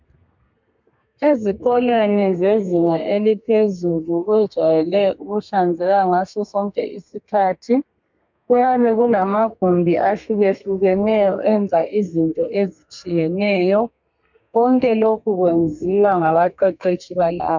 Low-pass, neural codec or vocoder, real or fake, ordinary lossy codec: 7.2 kHz; codec, 32 kHz, 1.9 kbps, SNAC; fake; MP3, 64 kbps